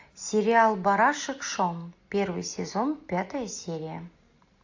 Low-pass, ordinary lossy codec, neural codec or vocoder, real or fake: 7.2 kHz; AAC, 48 kbps; none; real